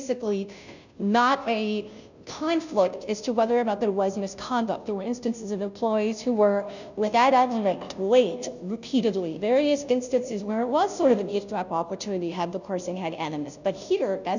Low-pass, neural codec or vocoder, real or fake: 7.2 kHz; codec, 16 kHz, 0.5 kbps, FunCodec, trained on Chinese and English, 25 frames a second; fake